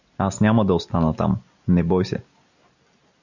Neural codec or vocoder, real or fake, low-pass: none; real; 7.2 kHz